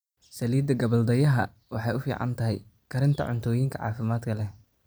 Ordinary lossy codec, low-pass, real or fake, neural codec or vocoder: none; none; fake; vocoder, 44.1 kHz, 128 mel bands every 256 samples, BigVGAN v2